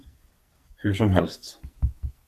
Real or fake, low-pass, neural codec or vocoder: fake; 14.4 kHz; codec, 44.1 kHz, 2.6 kbps, SNAC